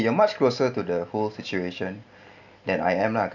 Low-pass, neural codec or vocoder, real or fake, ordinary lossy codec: 7.2 kHz; none; real; none